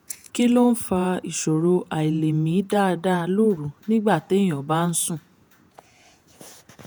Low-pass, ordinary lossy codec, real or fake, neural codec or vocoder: none; none; fake; vocoder, 48 kHz, 128 mel bands, Vocos